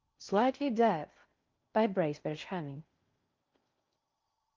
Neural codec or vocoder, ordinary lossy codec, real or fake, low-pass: codec, 16 kHz in and 24 kHz out, 0.6 kbps, FocalCodec, streaming, 4096 codes; Opus, 24 kbps; fake; 7.2 kHz